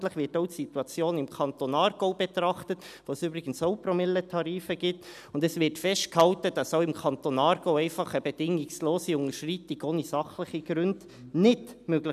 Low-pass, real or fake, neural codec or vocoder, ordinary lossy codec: 14.4 kHz; real; none; none